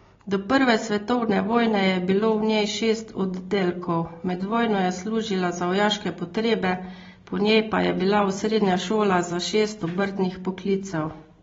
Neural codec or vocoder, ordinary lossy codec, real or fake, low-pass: none; AAC, 24 kbps; real; 7.2 kHz